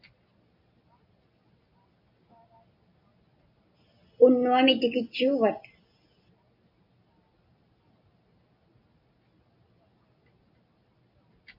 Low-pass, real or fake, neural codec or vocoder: 5.4 kHz; real; none